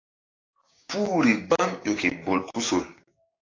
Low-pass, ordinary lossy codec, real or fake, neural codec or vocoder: 7.2 kHz; AAC, 32 kbps; fake; codec, 16 kHz, 6 kbps, DAC